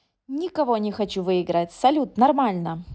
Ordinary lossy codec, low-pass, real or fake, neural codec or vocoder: none; none; real; none